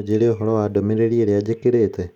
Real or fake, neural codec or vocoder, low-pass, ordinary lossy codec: real; none; 19.8 kHz; none